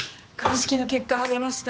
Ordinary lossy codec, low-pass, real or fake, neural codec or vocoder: none; none; fake; codec, 16 kHz, 2 kbps, X-Codec, HuBERT features, trained on general audio